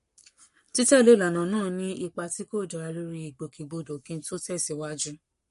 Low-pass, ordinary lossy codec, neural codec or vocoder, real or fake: 14.4 kHz; MP3, 48 kbps; codec, 44.1 kHz, 7.8 kbps, Pupu-Codec; fake